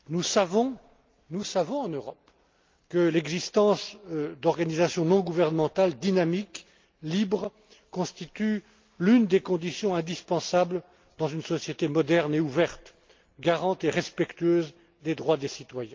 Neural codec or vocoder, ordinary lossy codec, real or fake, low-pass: none; Opus, 24 kbps; real; 7.2 kHz